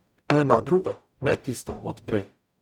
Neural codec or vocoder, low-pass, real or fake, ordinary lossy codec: codec, 44.1 kHz, 0.9 kbps, DAC; 19.8 kHz; fake; none